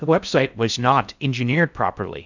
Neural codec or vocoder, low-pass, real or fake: codec, 16 kHz in and 24 kHz out, 0.6 kbps, FocalCodec, streaming, 4096 codes; 7.2 kHz; fake